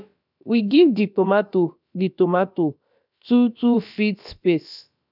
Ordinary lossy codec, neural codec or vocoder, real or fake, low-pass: none; codec, 16 kHz, about 1 kbps, DyCAST, with the encoder's durations; fake; 5.4 kHz